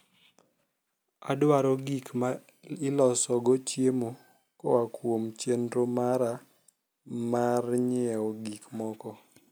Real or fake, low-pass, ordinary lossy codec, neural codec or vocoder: real; none; none; none